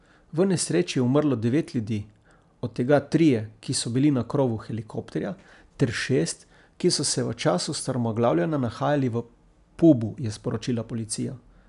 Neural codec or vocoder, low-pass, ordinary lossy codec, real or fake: none; 10.8 kHz; none; real